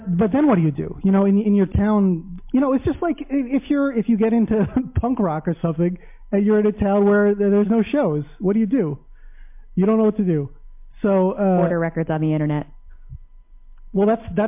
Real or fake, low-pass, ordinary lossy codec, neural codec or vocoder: real; 3.6 kHz; MP3, 32 kbps; none